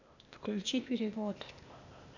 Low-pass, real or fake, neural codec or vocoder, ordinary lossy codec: 7.2 kHz; fake; codec, 16 kHz, 0.8 kbps, ZipCodec; none